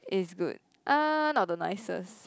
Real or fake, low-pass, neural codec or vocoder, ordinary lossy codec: real; none; none; none